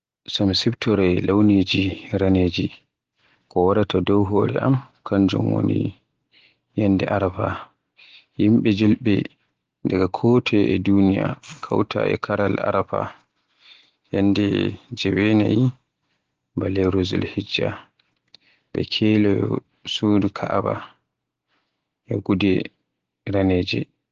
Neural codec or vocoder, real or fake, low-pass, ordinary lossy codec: none; real; 7.2 kHz; Opus, 16 kbps